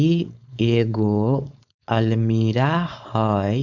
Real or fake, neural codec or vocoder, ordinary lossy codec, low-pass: fake; codec, 16 kHz, 4.8 kbps, FACodec; none; 7.2 kHz